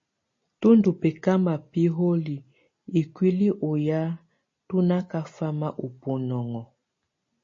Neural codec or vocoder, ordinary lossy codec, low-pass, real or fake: none; MP3, 32 kbps; 7.2 kHz; real